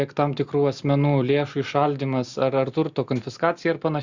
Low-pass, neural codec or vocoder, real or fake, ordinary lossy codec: 7.2 kHz; none; real; Opus, 64 kbps